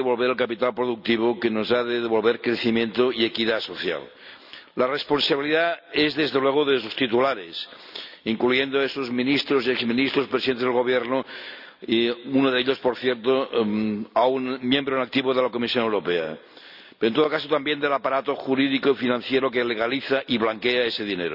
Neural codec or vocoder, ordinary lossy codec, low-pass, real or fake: none; none; 5.4 kHz; real